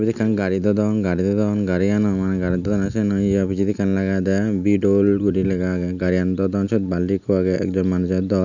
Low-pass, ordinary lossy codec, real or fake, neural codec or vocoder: 7.2 kHz; none; real; none